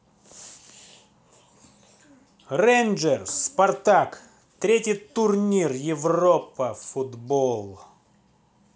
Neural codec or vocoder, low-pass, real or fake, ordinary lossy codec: none; none; real; none